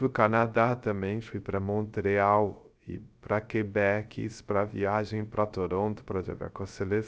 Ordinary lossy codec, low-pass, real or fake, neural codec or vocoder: none; none; fake; codec, 16 kHz, 0.3 kbps, FocalCodec